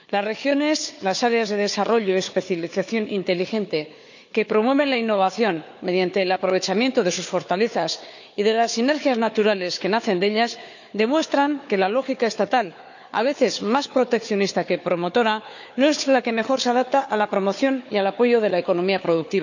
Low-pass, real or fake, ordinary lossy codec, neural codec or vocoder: 7.2 kHz; fake; none; codec, 16 kHz, 4 kbps, FunCodec, trained on Chinese and English, 50 frames a second